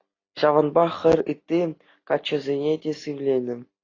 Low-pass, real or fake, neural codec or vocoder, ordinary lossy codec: 7.2 kHz; real; none; AAC, 32 kbps